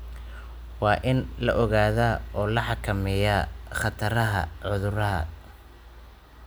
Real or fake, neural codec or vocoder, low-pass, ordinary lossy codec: real; none; none; none